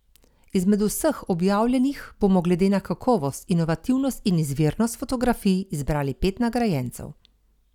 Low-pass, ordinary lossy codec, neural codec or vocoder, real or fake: 19.8 kHz; none; none; real